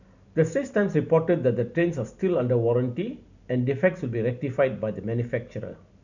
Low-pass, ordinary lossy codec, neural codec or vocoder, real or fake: 7.2 kHz; none; none; real